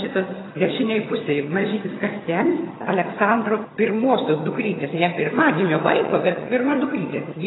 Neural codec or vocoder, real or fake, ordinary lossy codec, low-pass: vocoder, 22.05 kHz, 80 mel bands, HiFi-GAN; fake; AAC, 16 kbps; 7.2 kHz